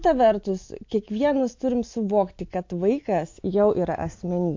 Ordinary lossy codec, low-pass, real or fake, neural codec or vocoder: MP3, 48 kbps; 7.2 kHz; real; none